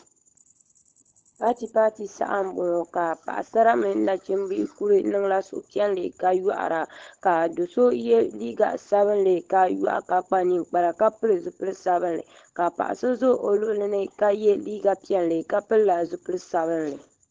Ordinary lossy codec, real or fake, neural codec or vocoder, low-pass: Opus, 16 kbps; fake; codec, 16 kHz, 16 kbps, FunCodec, trained on LibriTTS, 50 frames a second; 7.2 kHz